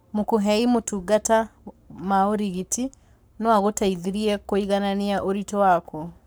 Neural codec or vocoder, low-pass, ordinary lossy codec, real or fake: codec, 44.1 kHz, 7.8 kbps, Pupu-Codec; none; none; fake